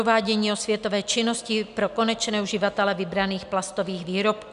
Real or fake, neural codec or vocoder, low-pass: real; none; 10.8 kHz